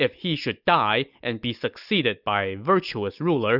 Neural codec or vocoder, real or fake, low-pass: none; real; 5.4 kHz